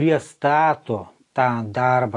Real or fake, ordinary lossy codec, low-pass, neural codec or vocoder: real; AAC, 48 kbps; 10.8 kHz; none